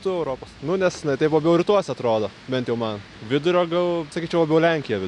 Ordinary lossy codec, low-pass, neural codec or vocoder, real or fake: MP3, 96 kbps; 10.8 kHz; none; real